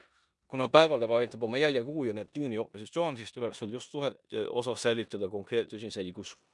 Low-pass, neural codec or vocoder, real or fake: 10.8 kHz; codec, 16 kHz in and 24 kHz out, 0.9 kbps, LongCat-Audio-Codec, four codebook decoder; fake